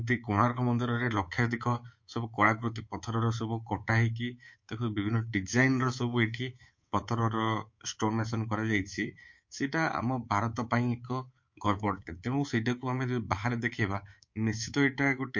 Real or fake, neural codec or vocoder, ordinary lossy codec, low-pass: fake; codec, 24 kHz, 3.1 kbps, DualCodec; MP3, 48 kbps; 7.2 kHz